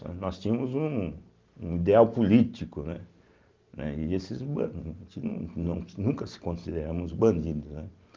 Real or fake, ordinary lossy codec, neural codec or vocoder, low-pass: real; Opus, 32 kbps; none; 7.2 kHz